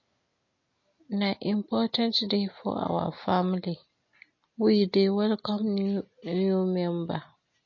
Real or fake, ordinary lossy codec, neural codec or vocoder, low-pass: real; MP3, 32 kbps; none; 7.2 kHz